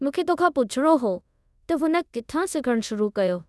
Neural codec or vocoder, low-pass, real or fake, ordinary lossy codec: codec, 24 kHz, 0.5 kbps, DualCodec; none; fake; none